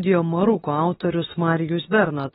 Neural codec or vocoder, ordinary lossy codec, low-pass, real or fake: autoencoder, 48 kHz, 32 numbers a frame, DAC-VAE, trained on Japanese speech; AAC, 16 kbps; 19.8 kHz; fake